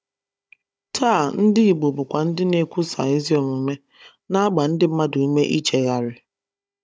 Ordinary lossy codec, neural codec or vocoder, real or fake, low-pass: none; codec, 16 kHz, 16 kbps, FunCodec, trained on Chinese and English, 50 frames a second; fake; none